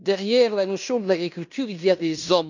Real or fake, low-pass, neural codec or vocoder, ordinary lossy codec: fake; 7.2 kHz; codec, 16 kHz in and 24 kHz out, 0.9 kbps, LongCat-Audio-Codec, fine tuned four codebook decoder; none